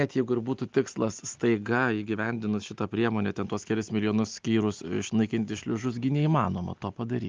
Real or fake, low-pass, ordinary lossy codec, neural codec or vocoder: real; 7.2 kHz; Opus, 32 kbps; none